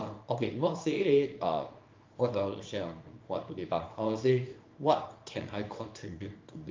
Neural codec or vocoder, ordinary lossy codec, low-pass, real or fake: codec, 24 kHz, 0.9 kbps, WavTokenizer, small release; Opus, 24 kbps; 7.2 kHz; fake